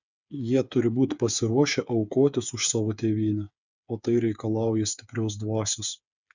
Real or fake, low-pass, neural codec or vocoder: fake; 7.2 kHz; codec, 16 kHz, 8 kbps, FreqCodec, smaller model